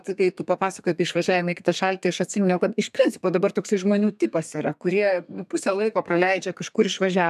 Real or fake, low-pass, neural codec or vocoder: fake; 14.4 kHz; codec, 32 kHz, 1.9 kbps, SNAC